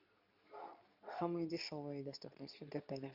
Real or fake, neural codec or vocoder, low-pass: fake; codec, 24 kHz, 0.9 kbps, WavTokenizer, medium speech release version 2; 5.4 kHz